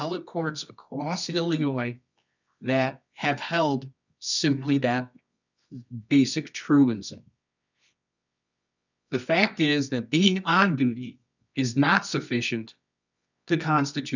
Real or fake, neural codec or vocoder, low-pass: fake; codec, 24 kHz, 0.9 kbps, WavTokenizer, medium music audio release; 7.2 kHz